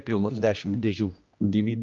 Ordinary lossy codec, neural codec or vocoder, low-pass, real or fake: Opus, 32 kbps; codec, 16 kHz, 1 kbps, X-Codec, HuBERT features, trained on general audio; 7.2 kHz; fake